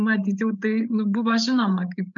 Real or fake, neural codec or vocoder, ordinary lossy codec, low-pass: fake; codec, 16 kHz, 8 kbps, FreqCodec, larger model; MP3, 64 kbps; 7.2 kHz